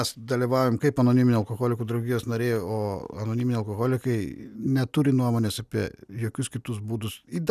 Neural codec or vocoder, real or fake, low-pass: none; real; 14.4 kHz